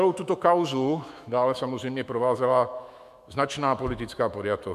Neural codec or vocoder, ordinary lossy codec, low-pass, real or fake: autoencoder, 48 kHz, 128 numbers a frame, DAC-VAE, trained on Japanese speech; MP3, 96 kbps; 14.4 kHz; fake